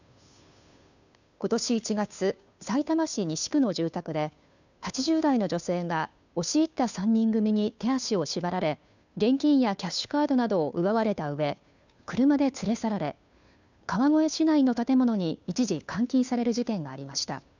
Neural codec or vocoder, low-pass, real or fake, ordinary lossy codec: codec, 16 kHz, 2 kbps, FunCodec, trained on Chinese and English, 25 frames a second; 7.2 kHz; fake; none